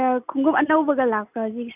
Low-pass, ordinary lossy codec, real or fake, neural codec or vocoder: 3.6 kHz; none; real; none